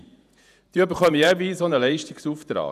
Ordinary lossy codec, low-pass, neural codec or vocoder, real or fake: none; 14.4 kHz; vocoder, 48 kHz, 128 mel bands, Vocos; fake